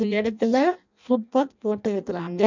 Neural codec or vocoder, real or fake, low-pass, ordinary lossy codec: codec, 16 kHz in and 24 kHz out, 0.6 kbps, FireRedTTS-2 codec; fake; 7.2 kHz; none